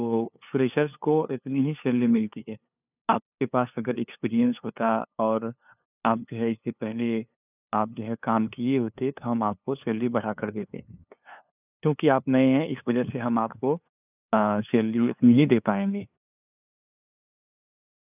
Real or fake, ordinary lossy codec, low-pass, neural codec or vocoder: fake; none; 3.6 kHz; codec, 16 kHz, 2 kbps, FunCodec, trained on LibriTTS, 25 frames a second